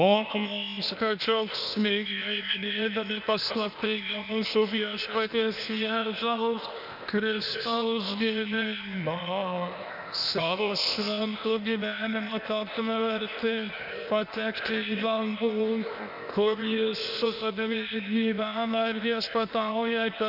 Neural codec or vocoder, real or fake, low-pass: codec, 16 kHz, 0.8 kbps, ZipCodec; fake; 5.4 kHz